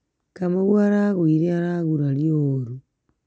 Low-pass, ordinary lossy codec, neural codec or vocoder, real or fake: none; none; none; real